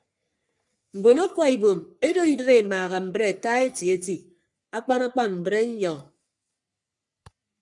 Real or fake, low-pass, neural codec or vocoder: fake; 10.8 kHz; codec, 44.1 kHz, 3.4 kbps, Pupu-Codec